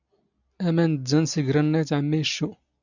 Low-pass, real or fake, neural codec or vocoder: 7.2 kHz; real; none